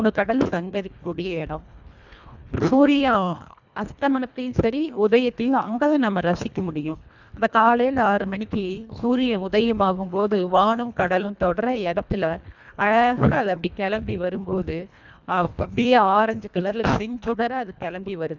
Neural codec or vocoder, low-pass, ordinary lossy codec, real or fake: codec, 24 kHz, 1.5 kbps, HILCodec; 7.2 kHz; none; fake